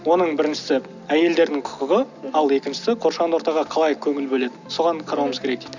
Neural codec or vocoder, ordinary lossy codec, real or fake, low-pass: none; none; real; 7.2 kHz